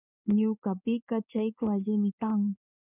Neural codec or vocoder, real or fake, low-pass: codec, 16 kHz in and 24 kHz out, 1 kbps, XY-Tokenizer; fake; 3.6 kHz